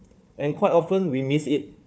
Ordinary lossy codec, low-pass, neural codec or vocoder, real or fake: none; none; codec, 16 kHz, 4 kbps, FunCodec, trained on Chinese and English, 50 frames a second; fake